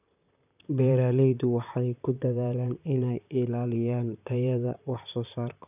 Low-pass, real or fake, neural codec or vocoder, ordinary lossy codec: 3.6 kHz; fake; vocoder, 44.1 kHz, 128 mel bands, Pupu-Vocoder; none